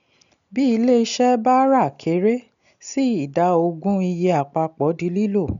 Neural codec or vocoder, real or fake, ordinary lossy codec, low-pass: none; real; none; 7.2 kHz